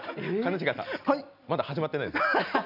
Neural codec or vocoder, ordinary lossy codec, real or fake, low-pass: none; none; real; 5.4 kHz